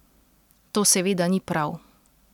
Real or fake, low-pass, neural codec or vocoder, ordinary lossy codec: real; 19.8 kHz; none; none